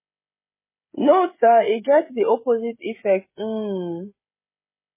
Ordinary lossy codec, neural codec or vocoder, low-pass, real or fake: MP3, 16 kbps; codec, 16 kHz, 16 kbps, FreqCodec, smaller model; 3.6 kHz; fake